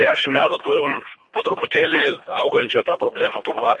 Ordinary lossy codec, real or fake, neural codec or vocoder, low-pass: MP3, 48 kbps; fake; codec, 24 kHz, 1.5 kbps, HILCodec; 10.8 kHz